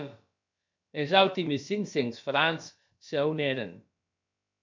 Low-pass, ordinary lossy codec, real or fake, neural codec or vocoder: 7.2 kHz; MP3, 64 kbps; fake; codec, 16 kHz, about 1 kbps, DyCAST, with the encoder's durations